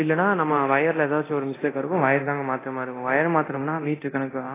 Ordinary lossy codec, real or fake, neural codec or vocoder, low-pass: AAC, 16 kbps; fake; codec, 24 kHz, 0.9 kbps, DualCodec; 3.6 kHz